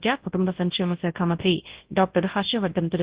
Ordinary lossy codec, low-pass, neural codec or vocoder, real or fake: Opus, 16 kbps; 3.6 kHz; codec, 24 kHz, 0.9 kbps, WavTokenizer, large speech release; fake